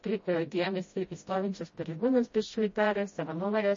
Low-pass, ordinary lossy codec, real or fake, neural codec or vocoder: 7.2 kHz; MP3, 32 kbps; fake; codec, 16 kHz, 0.5 kbps, FreqCodec, smaller model